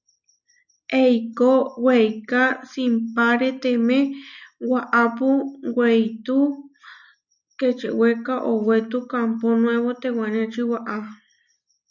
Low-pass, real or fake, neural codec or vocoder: 7.2 kHz; real; none